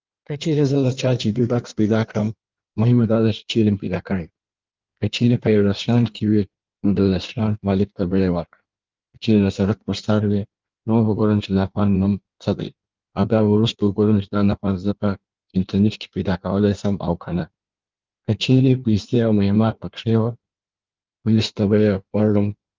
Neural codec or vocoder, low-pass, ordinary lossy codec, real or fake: codec, 16 kHz in and 24 kHz out, 1.1 kbps, FireRedTTS-2 codec; 7.2 kHz; Opus, 32 kbps; fake